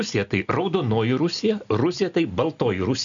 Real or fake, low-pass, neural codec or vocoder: real; 7.2 kHz; none